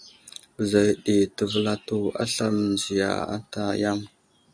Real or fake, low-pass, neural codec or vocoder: real; 9.9 kHz; none